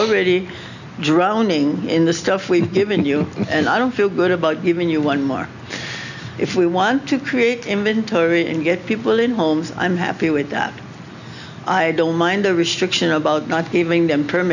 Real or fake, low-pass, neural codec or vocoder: real; 7.2 kHz; none